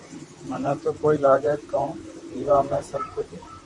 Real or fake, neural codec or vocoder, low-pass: fake; vocoder, 44.1 kHz, 128 mel bands, Pupu-Vocoder; 10.8 kHz